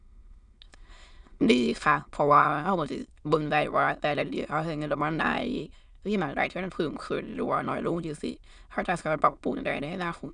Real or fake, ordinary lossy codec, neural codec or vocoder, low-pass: fake; none; autoencoder, 22.05 kHz, a latent of 192 numbers a frame, VITS, trained on many speakers; 9.9 kHz